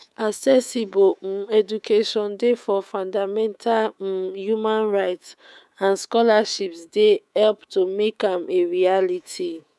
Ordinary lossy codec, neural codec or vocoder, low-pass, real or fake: none; codec, 24 kHz, 3.1 kbps, DualCodec; none; fake